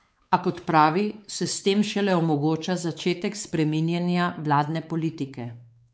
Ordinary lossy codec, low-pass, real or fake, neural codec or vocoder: none; none; fake; codec, 16 kHz, 4 kbps, X-Codec, WavLM features, trained on Multilingual LibriSpeech